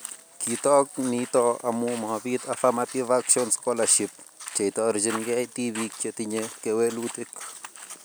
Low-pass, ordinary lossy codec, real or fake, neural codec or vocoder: none; none; real; none